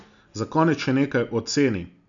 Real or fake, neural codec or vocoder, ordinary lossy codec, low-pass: real; none; none; 7.2 kHz